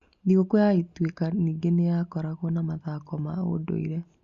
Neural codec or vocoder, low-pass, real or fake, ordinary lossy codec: none; 7.2 kHz; real; Opus, 64 kbps